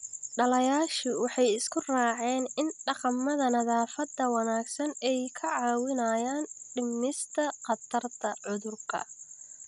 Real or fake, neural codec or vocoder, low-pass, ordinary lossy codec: real; none; 10.8 kHz; none